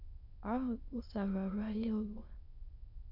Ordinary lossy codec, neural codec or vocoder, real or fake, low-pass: AAC, 48 kbps; autoencoder, 22.05 kHz, a latent of 192 numbers a frame, VITS, trained on many speakers; fake; 5.4 kHz